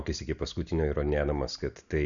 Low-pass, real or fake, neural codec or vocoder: 7.2 kHz; real; none